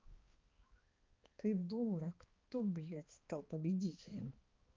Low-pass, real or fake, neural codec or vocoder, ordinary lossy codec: 7.2 kHz; fake; codec, 16 kHz, 2 kbps, X-Codec, HuBERT features, trained on balanced general audio; Opus, 32 kbps